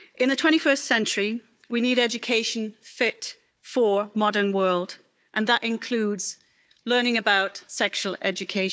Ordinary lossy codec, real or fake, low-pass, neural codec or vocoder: none; fake; none; codec, 16 kHz, 4 kbps, FunCodec, trained on Chinese and English, 50 frames a second